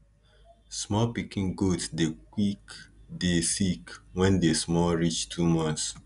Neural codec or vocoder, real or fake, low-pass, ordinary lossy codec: none; real; 10.8 kHz; none